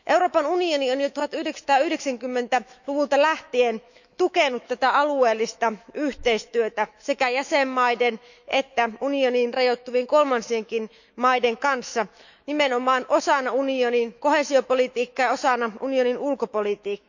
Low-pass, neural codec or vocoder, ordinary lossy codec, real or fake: 7.2 kHz; autoencoder, 48 kHz, 128 numbers a frame, DAC-VAE, trained on Japanese speech; none; fake